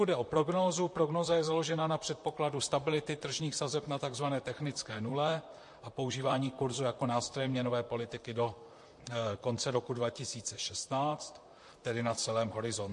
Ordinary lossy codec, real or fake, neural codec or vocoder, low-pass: MP3, 48 kbps; fake; vocoder, 44.1 kHz, 128 mel bands, Pupu-Vocoder; 10.8 kHz